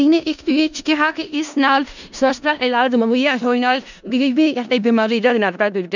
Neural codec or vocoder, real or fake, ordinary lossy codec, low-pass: codec, 16 kHz in and 24 kHz out, 0.4 kbps, LongCat-Audio-Codec, four codebook decoder; fake; none; 7.2 kHz